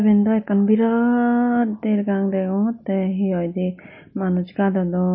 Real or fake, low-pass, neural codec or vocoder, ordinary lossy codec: real; 7.2 kHz; none; MP3, 24 kbps